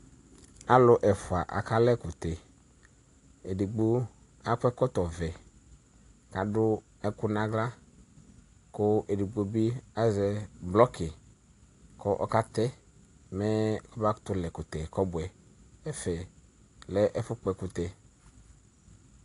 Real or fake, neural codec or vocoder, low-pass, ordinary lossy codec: real; none; 10.8 kHz; AAC, 48 kbps